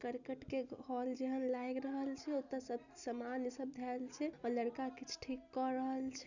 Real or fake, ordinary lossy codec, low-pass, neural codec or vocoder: real; none; 7.2 kHz; none